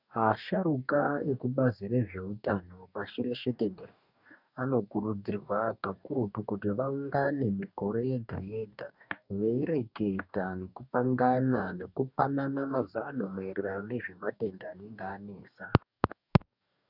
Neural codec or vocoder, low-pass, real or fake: codec, 44.1 kHz, 2.6 kbps, DAC; 5.4 kHz; fake